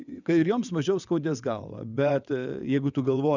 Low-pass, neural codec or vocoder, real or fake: 7.2 kHz; vocoder, 22.05 kHz, 80 mel bands, WaveNeXt; fake